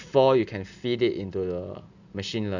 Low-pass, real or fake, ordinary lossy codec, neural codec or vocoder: 7.2 kHz; real; none; none